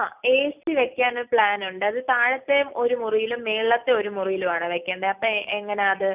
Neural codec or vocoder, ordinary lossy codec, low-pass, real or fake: none; none; 3.6 kHz; real